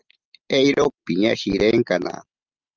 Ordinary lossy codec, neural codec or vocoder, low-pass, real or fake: Opus, 24 kbps; none; 7.2 kHz; real